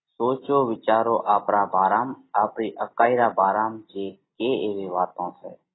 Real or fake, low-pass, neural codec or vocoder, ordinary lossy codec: real; 7.2 kHz; none; AAC, 16 kbps